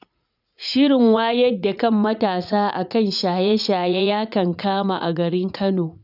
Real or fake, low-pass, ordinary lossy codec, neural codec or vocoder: fake; 5.4 kHz; none; vocoder, 44.1 kHz, 80 mel bands, Vocos